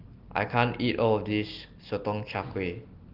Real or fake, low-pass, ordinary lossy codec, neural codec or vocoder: real; 5.4 kHz; Opus, 32 kbps; none